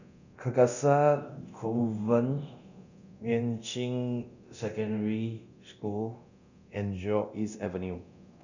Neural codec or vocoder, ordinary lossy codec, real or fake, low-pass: codec, 24 kHz, 0.9 kbps, DualCodec; none; fake; 7.2 kHz